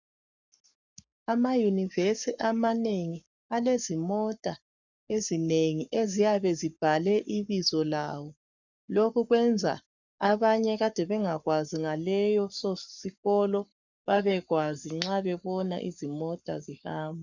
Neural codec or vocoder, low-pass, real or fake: codec, 44.1 kHz, 7.8 kbps, Pupu-Codec; 7.2 kHz; fake